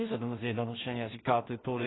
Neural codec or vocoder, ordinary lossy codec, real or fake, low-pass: codec, 16 kHz in and 24 kHz out, 0.4 kbps, LongCat-Audio-Codec, two codebook decoder; AAC, 16 kbps; fake; 7.2 kHz